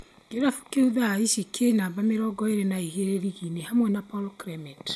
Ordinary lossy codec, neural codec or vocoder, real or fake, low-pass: none; none; real; none